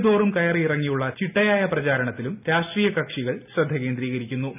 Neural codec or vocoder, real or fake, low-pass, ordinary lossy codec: none; real; 3.6 kHz; none